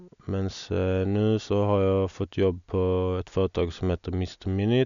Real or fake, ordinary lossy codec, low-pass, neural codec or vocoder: real; none; 7.2 kHz; none